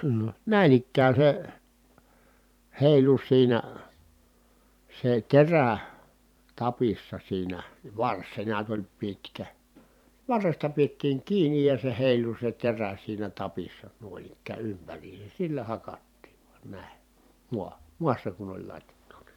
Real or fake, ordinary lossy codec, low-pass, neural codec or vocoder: fake; none; 19.8 kHz; vocoder, 44.1 kHz, 128 mel bands every 512 samples, BigVGAN v2